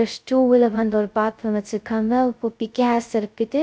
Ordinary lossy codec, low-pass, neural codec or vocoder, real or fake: none; none; codec, 16 kHz, 0.2 kbps, FocalCodec; fake